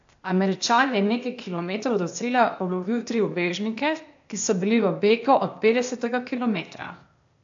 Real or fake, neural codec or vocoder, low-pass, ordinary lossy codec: fake; codec, 16 kHz, 0.8 kbps, ZipCodec; 7.2 kHz; AAC, 64 kbps